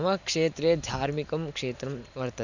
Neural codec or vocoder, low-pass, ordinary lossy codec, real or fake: vocoder, 44.1 kHz, 128 mel bands every 512 samples, BigVGAN v2; 7.2 kHz; none; fake